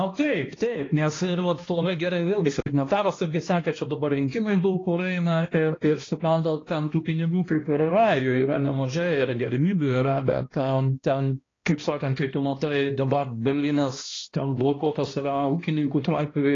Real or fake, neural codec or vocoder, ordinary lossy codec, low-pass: fake; codec, 16 kHz, 1 kbps, X-Codec, HuBERT features, trained on balanced general audio; AAC, 32 kbps; 7.2 kHz